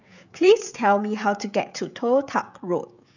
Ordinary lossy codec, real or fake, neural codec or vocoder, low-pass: none; fake; codec, 16 kHz, 16 kbps, FreqCodec, smaller model; 7.2 kHz